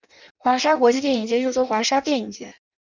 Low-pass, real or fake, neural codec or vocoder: 7.2 kHz; fake; codec, 16 kHz in and 24 kHz out, 0.6 kbps, FireRedTTS-2 codec